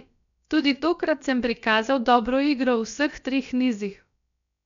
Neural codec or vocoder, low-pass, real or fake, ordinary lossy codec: codec, 16 kHz, about 1 kbps, DyCAST, with the encoder's durations; 7.2 kHz; fake; none